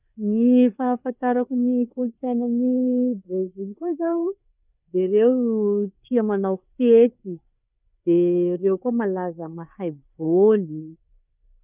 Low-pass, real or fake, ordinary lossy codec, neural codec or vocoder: 3.6 kHz; fake; none; codec, 16 kHz, 4 kbps, FreqCodec, larger model